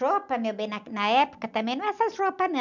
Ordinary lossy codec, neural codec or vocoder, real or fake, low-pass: none; none; real; 7.2 kHz